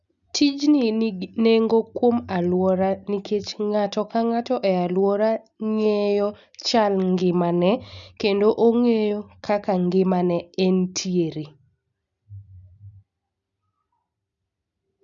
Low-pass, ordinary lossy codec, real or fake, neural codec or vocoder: 7.2 kHz; none; real; none